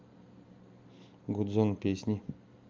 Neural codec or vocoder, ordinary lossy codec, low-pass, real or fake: none; Opus, 24 kbps; 7.2 kHz; real